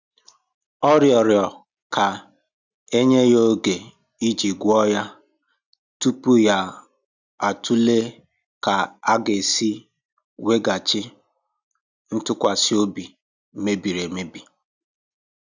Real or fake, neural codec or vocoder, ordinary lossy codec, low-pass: real; none; none; 7.2 kHz